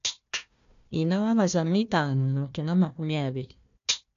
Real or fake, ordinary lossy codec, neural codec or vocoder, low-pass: fake; MP3, 64 kbps; codec, 16 kHz, 1 kbps, FunCodec, trained on Chinese and English, 50 frames a second; 7.2 kHz